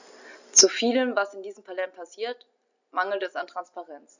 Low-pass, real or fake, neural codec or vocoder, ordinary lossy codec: 7.2 kHz; real; none; none